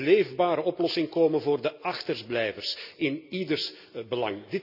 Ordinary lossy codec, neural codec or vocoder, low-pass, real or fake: none; none; 5.4 kHz; real